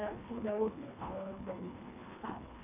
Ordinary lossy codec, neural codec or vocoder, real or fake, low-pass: none; codec, 24 kHz, 1.5 kbps, HILCodec; fake; 3.6 kHz